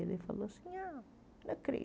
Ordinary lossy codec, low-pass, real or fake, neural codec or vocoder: none; none; real; none